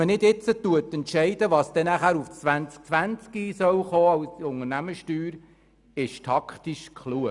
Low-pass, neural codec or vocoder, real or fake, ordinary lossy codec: 10.8 kHz; none; real; none